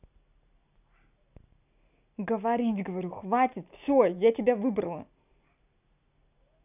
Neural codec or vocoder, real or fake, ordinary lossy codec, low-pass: none; real; none; 3.6 kHz